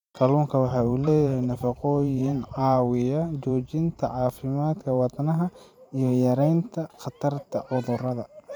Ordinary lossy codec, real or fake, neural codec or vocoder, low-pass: none; fake; vocoder, 44.1 kHz, 128 mel bands every 512 samples, BigVGAN v2; 19.8 kHz